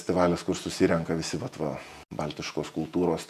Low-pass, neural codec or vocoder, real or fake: 14.4 kHz; vocoder, 48 kHz, 128 mel bands, Vocos; fake